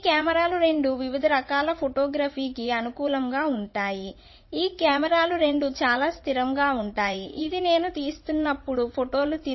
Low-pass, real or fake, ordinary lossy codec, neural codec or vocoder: 7.2 kHz; fake; MP3, 24 kbps; vocoder, 44.1 kHz, 80 mel bands, Vocos